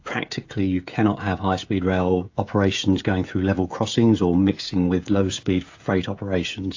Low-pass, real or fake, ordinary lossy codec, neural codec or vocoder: 7.2 kHz; fake; AAC, 48 kbps; codec, 16 kHz, 16 kbps, FreqCodec, smaller model